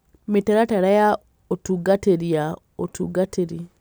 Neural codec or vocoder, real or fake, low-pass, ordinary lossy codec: none; real; none; none